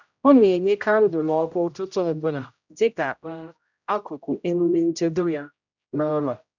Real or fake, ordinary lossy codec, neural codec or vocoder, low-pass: fake; none; codec, 16 kHz, 0.5 kbps, X-Codec, HuBERT features, trained on general audio; 7.2 kHz